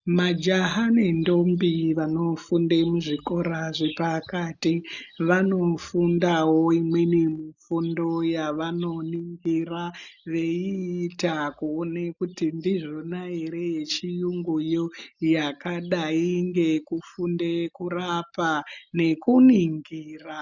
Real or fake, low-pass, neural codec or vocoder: real; 7.2 kHz; none